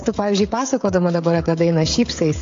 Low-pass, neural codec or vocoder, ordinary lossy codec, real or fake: 7.2 kHz; codec, 16 kHz, 16 kbps, FreqCodec, smaller model; AAC, 48 kbps; fake